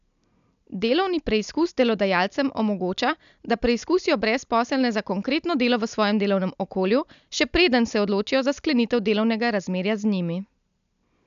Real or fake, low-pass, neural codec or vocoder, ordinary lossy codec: real; 7.2 kHz; none; none